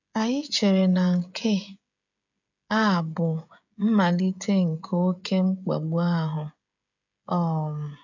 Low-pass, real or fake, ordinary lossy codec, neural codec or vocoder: 7.2 kHz; fake; none; codec, 16 kHz, 16 kbps, FreqCodec, smaller model